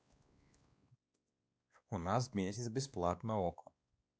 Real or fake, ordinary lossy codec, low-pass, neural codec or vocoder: fake; none; none; codec, 16 kHz, 2 kbps, X-Codec, WavLM features, trained on Multilingual LibriSpeech